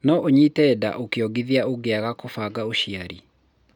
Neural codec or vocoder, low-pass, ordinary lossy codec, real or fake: none; 19.8 kHz; none; real